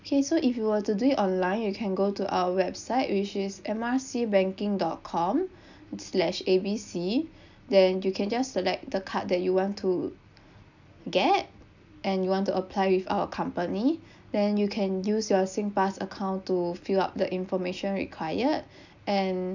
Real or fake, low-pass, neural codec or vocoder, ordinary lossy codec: real; 7.2 kHz; none; none